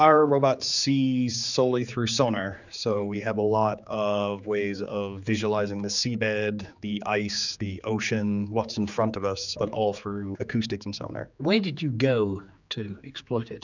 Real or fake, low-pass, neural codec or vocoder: fake; 7.2 kHz; codec, 16 kHz, 4 kbps, X-Codec, HuBERT features, trained on general audio